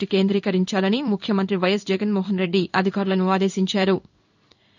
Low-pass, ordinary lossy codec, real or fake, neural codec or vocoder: 7.2 kHz; none; fake; codec, 16 kHz in and 24 kHz out, 1 kbps, XY-Tokenizer